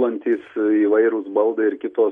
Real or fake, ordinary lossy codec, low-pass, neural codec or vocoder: real; MP3, 48 kbps; 19.8 kHz; none